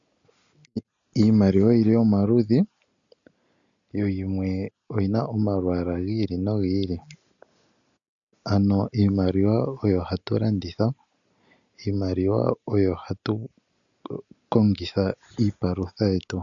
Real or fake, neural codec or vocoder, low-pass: real; none; 7.2 kHz